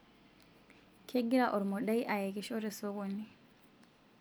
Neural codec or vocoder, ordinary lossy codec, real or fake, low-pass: none; none; real; none